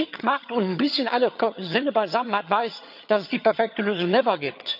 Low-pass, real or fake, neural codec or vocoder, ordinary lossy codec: 5.4 kHz; fake; vocoder, 22.05 kHz, 80 mel bands, HiFi-GAN; none